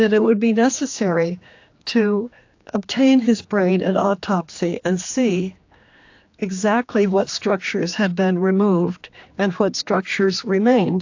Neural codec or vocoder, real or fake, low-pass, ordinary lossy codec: codec, 16 kHz, 2 kbps, X-Codec, HuBERT features, trained on general audio; fake; 7.2 kHz; AAC, 48 kbps